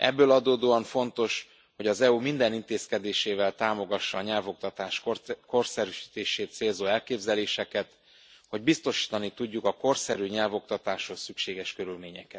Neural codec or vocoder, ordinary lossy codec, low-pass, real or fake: none; none; none; real